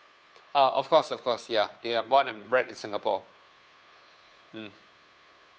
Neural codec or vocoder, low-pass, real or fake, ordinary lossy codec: codec, 16 kHz, 2 kbps, FunCodec, trained on Chinese and English, 25 frames a second; none; fake; none